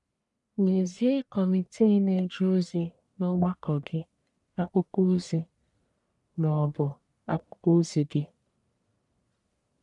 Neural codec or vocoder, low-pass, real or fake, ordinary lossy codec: codec, 44.1 kHz, 1.7 kbps, Pupu-Codec; 10.8 kHz; fake; none